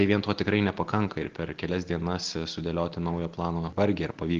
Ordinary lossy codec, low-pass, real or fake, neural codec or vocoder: Opus, 16 kbps; 7.2 kHz; real; none